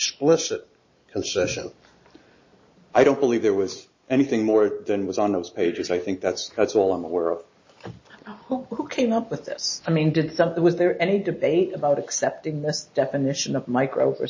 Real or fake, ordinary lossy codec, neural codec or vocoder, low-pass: real; MP3, 32 kbps; none; 7.2 kHz